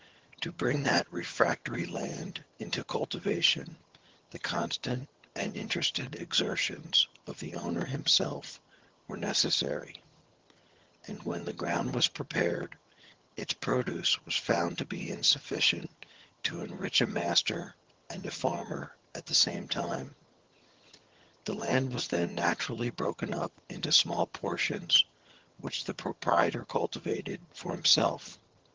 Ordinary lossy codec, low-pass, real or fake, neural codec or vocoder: Opus, 16 kbps; 7.2 kHz; fake; vocoder, 22.05 kHz, 80 mel bands, HiFi-GAN